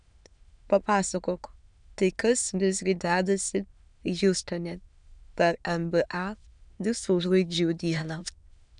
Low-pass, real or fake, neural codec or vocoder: 9.9 kHz; fake; autoencoder, 22.05 kHz, a latent of 192 numbers a frame, VITS, trained on many speakers